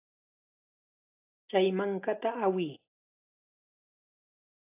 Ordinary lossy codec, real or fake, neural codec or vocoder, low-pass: AAC, 32 kbps; real; none; 3.6 kHz